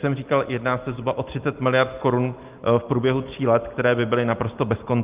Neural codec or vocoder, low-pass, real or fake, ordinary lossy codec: none; 3.6 kHz; real; Opus, 32 kbps